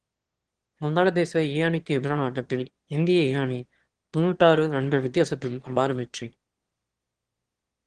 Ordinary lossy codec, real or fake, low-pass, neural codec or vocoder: Opus, 16 kbps; fake; 9.9 kHz; autoencoder, 22.05 kHz, a latent of 192 numbers a frame, VITS, trained on one speaker